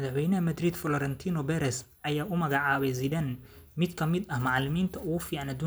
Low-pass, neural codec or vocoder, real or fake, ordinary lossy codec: none; none; real; none